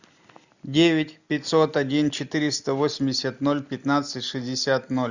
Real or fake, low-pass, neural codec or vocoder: real; 7.2 kHz; none